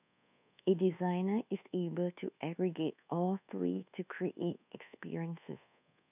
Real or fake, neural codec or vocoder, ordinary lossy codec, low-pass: fake; codec, 24 kHz, 1.2 kbps, DualCodec; none; 3.6 kHz